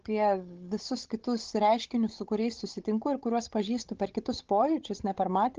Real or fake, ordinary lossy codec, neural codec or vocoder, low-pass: fake; Opus, 16 kbps; codec, 16 kHz, 16 kbps, FunCodec, trained on Chinese and English, 50 frames a second; 7.2 kHz